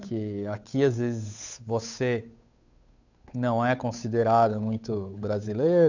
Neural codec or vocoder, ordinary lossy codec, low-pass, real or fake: codec, 16 kHz, 8 kbps, FunCodec, trained on Chinese and English, 25 frames a second; none; 7.2 kHz; fake